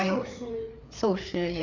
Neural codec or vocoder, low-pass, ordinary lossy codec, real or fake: codec, 16 kHz, 4 kbps, FreqCodec, larger model; 7.2 kHz; none; fake